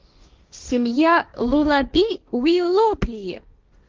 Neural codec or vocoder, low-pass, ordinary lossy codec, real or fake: codec, 16 kHz, 1.1 kbps, Voila-Tokenizer; 7.2 kHz; Opus, 32 kbps; fake